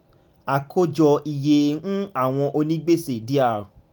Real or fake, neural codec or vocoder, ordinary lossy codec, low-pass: real; none; none; 19.8 kHz